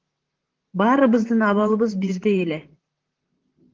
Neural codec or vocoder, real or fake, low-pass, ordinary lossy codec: vocoder, 44.1 kHz, 128 mel bands, Pupu-Vocoder; fake; 7.2 kHz; Opus, 16 kbps